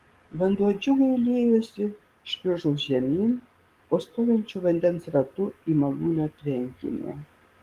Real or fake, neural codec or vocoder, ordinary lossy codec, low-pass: fake; codec, 44.1 kHz, 7.8 kbps, DAC; Opus, 24 kbps; 14.4 kHz